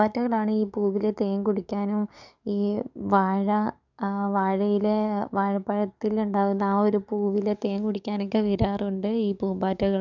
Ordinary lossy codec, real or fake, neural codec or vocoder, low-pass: none; fake; codec, 44.1 kHz, 7.8 kbps, DAC; 7.2 kHz